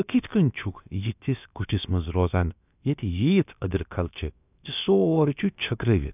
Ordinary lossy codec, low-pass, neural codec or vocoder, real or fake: none; 3.6 kHz; codec, 16 kHz, about 1 kbps, DyCAST, with the encoder's durations; fake